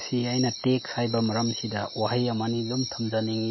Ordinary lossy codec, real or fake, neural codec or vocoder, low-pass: MP3, 24 kbps; real; none; 7.2 kHz